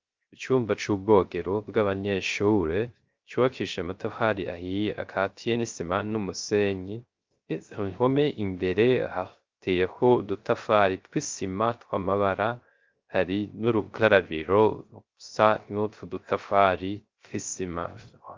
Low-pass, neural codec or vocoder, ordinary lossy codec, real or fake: 7.2 kHz; codec, 16 kHz, 0.3 kbps, FocalCodec; Opus, 32 kbps; fake